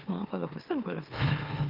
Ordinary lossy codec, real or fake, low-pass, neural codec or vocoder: Opus, 32 kbps; fake; 5.4 kHz; autoencoder, 44.1 kHz, a latent of 192 numbers a frame, MeloTTS